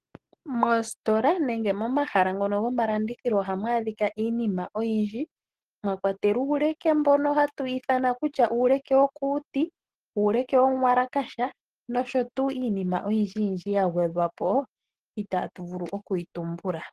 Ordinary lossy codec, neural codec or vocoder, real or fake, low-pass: Opus, 16 kbps; none; real; 14.4 kHz